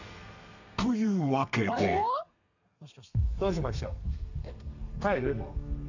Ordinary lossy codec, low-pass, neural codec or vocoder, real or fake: none; 7.2 kHz; codec, 32 kHz, 1.9 kbps, SNAC; fake